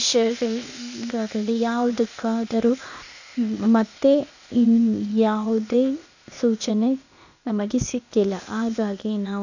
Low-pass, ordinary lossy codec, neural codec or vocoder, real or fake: 7.2 kHz; none; codec, 16 kHz, 0.8 kbps, ZipCodec; fake